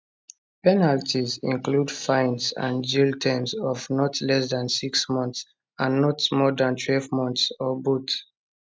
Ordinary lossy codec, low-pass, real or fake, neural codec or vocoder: none; none; real; none